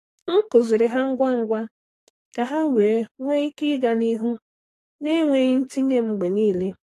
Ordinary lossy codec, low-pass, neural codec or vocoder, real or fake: AAC, 48 kbps; 14.4 kHz; codec, 44.1 kHz, 2.6 kbps, SNAC; fake